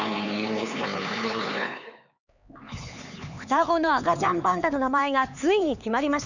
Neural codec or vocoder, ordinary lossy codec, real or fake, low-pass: codec, 16 kHz, 4 kbps, X-Codec, HuBERT features, trained on LibriSpeech; none; fake; 7.2 kHz